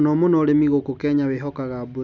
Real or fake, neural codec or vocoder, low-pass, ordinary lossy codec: real; none; 7.2 kHz; none